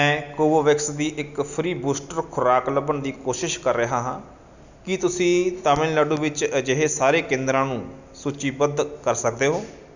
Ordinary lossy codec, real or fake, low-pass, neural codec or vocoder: none; real; 7.2 kHz; none